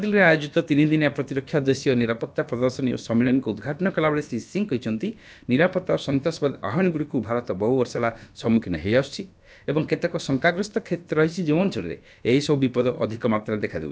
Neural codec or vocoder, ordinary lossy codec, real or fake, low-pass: codec, 16 kHz, about 1 kbps, DyCAST, with the encoder's durations; none; fake; none